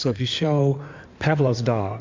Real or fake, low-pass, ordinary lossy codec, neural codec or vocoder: fake; 7.2 kHz; MP3, 64 kbps; codec, 16 kHz in and 24 kHz out, 2.2 kbps, FireRedTTS-2 codec